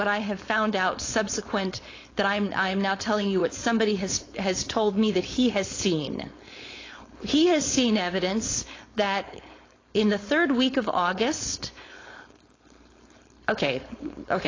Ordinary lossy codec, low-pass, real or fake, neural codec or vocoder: AAC, 32 kbps; 7.2 kHz; fake; codec, 16 kHz, 4.8 kbps, FACodec